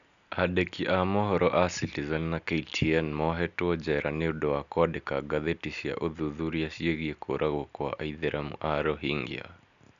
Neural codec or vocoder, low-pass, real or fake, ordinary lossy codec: none; 7.2 kHz; real; none